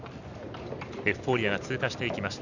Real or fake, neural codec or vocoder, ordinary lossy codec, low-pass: real; none; none; 7.2 kHz